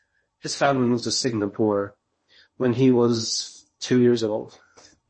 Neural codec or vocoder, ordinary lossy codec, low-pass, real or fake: codec, 16 kHz in and 24 kHz out, 0.6 kbps, FocalCodec, streaming, 4096 codes; MP3, 32 kbps; 9.9 kHz; fake